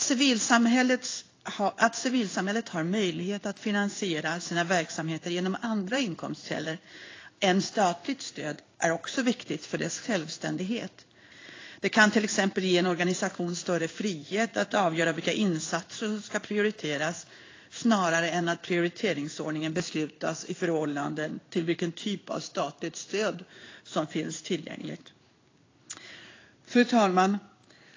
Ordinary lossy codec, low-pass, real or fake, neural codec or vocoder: AAC, 32 kbps; 7.2 kHz; fake; codec, 16 kHz in and 24 kHz out, 1 kbps, XY-Tokenizer